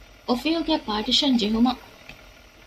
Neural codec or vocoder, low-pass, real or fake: none; 14.4 kHz; real